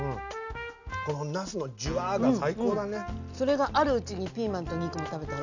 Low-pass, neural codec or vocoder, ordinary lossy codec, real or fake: 7.2 kHz; none; none; real